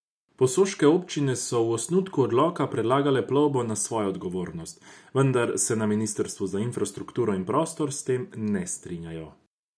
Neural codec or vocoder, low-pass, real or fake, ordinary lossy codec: none; none; real; none